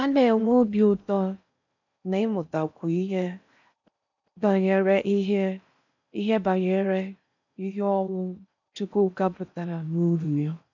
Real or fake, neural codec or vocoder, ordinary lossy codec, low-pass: fake; codec, 16 kHz in and 24 kHz out, 0.6 kbps, FocalCodec, streaming, 4096 codes; none; 7.2 kHz